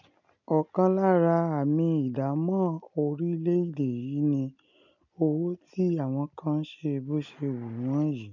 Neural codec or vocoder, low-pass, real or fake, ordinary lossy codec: none; 7.2 kHz; real; none